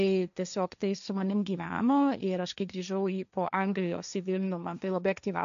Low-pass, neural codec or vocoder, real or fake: 7.2 kHz; codec, 16 kHz, 1.1 kbps, Voila-Tokenizer; fake